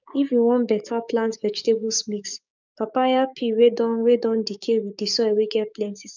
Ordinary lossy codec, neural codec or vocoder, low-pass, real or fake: none; codec, 16 kHz, 6 kbps, DAC; 7.2 kHz; fake